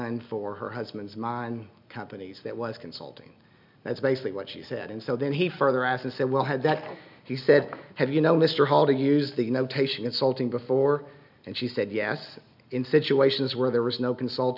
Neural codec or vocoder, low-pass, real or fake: none; 5.4 kHz; real